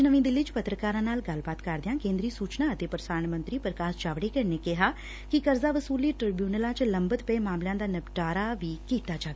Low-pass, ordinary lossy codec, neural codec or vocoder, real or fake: none; none; none; real